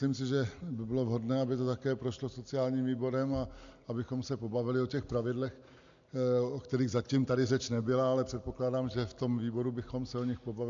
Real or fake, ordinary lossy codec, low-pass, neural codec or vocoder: real; MP3, 64 kbps; 7.2 kHz; none